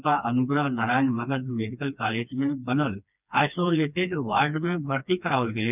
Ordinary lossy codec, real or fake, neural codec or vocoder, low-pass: none; fake; codec, 16 kHz, 2 kbps, FreqCodec, smaller model; 3.6 kHz